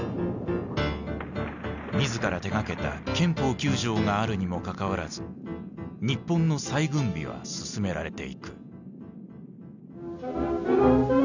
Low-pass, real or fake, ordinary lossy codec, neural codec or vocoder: 7.2 kHz; real; none; none